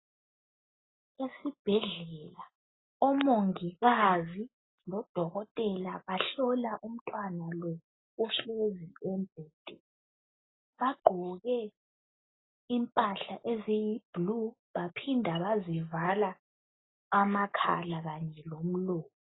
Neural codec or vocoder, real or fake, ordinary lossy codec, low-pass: none; real; AAC, 16 kbps; 7.2 kHz